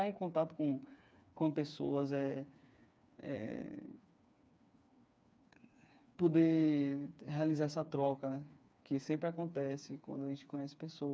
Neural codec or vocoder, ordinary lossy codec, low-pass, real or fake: codec, 16 kHz, 4 kbps, FreqCodec, smaller model; none; none; fake